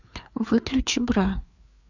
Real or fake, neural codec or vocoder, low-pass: fake; codec, 16 kHz, 4 kbps, FreqCodec, larger model; 7.2 kHz